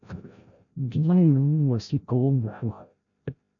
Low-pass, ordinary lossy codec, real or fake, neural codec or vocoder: 7.2 kHz; MP3, 96 kbps; fake; codec, 16 kHz, 0.5 kbps, FreqCodec, larger model